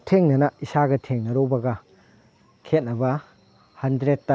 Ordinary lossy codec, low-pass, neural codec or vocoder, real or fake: none; none; none; real